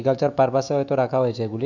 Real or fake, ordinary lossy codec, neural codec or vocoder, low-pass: fake; none; autoencoder, 48 kHz, 128 numbers a frame, DAC-VAE, trained on Japanese speech; 7.2 kHz